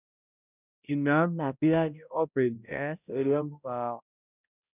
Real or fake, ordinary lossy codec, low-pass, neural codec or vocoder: fake; none; 3.6 kHz; codec, 16 kHz, 0.5 kbps, X-Codec, HuBERT features, trained on balanced general audio